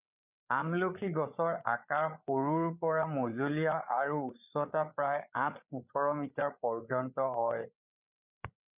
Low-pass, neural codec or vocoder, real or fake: 3.6 kHz; codec, 16 kHz, 8 kbps, FreqCodec, larger model; fake